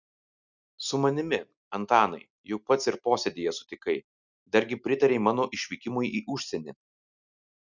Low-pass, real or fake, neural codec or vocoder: 7.2 kHz; real; none